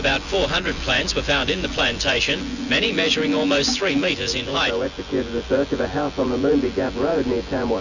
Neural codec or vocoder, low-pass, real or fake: vocoder, 24 kHz, 100 mel bands, Vocos; 7.2 kHz; fake